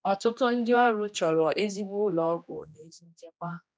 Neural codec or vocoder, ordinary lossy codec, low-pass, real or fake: codec, 16 kHz, 1 kbps, X-Codec, HuBERT features, trained on general audio; none; none; fake